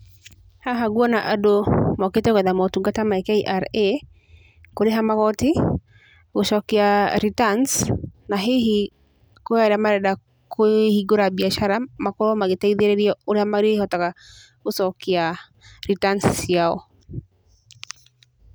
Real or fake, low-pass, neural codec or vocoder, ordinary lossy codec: real; none; none; none